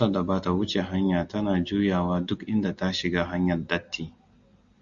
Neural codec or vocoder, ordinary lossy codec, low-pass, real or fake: none; Opus, 64 kbps; 7.2 kHz; real